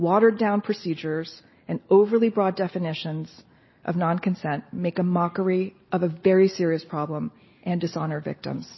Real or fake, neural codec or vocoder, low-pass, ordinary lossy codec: real; none; 7.2 kHz; MP3, 24 kbps